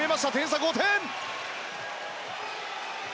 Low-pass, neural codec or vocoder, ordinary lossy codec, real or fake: none; none; none; real